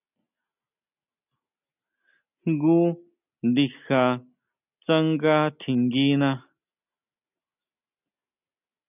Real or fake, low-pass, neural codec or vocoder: real; 3.6 kHz; none